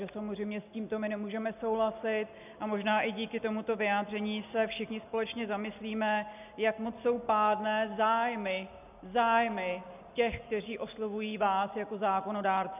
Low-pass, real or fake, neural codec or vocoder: 3.6 kHz; real; none